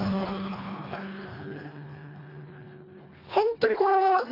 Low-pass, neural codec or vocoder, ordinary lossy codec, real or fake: 5.4 kHz; codec, 24 kHz, 1.5 kbps, HILCodec; AAC, 24 kbps; fake